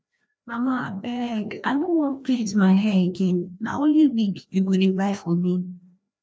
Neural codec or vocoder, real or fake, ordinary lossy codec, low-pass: codec, 16 kHz, 1 kbps, FreqCodec, larger model; fake; none; none